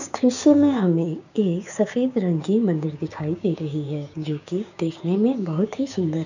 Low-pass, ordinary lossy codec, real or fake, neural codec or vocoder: 7.2 kHz; none; fake; codec, 16 kHz, 6 kbps, DAC